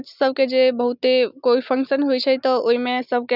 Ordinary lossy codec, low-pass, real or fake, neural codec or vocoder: none; 5.4 kHz; real; none